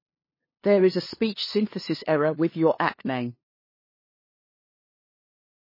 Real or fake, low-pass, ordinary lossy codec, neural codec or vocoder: fake; 5.4 kHz; MP3, 24 kbps; codec, 16 kHz, 2 kbps, FunCodec, trained on LibriTTS, 25 frames a second